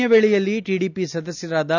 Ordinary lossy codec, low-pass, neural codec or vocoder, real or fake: none; 7.2 kHz; none; real